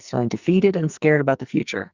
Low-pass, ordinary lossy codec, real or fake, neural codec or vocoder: 7.2 kHz; Opus, 64 kbps; fake; codec, 44.1 kHz, 2.6 kbps, SNAC